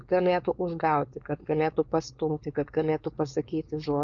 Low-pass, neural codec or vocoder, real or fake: 7.2 kHz; codec, 16 kHz, 4.8 kbps, FACodec; fake